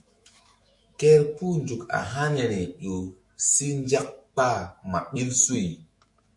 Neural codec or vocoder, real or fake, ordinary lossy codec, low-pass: autoencoder, 48 kHz, 128 numbers a frame, DAC-VAE, trained on Japanese speech; fake; MP3, 48 kbps; 10.8 kHz